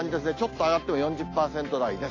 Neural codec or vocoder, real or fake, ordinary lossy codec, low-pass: none; real; AAC, 32 kbps; 7.2 kHz